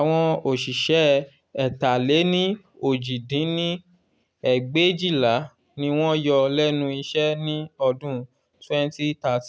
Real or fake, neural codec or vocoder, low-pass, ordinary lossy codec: real; none; none; none